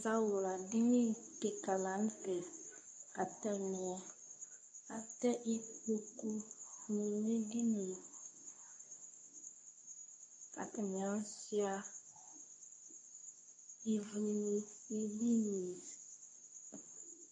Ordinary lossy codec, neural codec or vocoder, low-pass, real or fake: MP3, 96 kbps; codec, 24 kHz, 0.9 kbps, WavTokenizer, medium speech release version 2; 9.9 kHz; fake